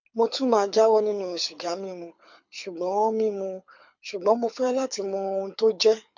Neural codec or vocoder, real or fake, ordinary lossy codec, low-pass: codec, 24 kHz, 6 kbps, HILCodec; fake; MP3, 64 kbps; 7.2 kHz